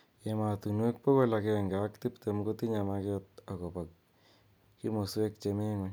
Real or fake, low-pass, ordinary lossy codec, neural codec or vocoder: real; none; none; none